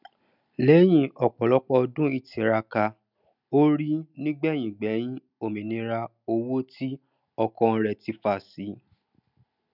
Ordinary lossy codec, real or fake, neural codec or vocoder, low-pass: none; real; none; 5.4 kHz